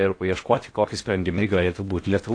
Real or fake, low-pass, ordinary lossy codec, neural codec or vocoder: fake; 9.9 kHz; AAC, 48 kbps; codec, 16 kHz in and 24 kHz out, 0.8 kbps, FocalCodec, streaming, 65536 codes